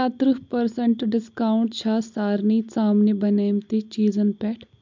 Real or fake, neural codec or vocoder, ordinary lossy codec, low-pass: real; none; MP3, 64 kbps; 7.2 kHz